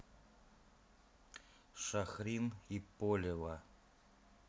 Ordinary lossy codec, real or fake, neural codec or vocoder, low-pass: none; real; none; none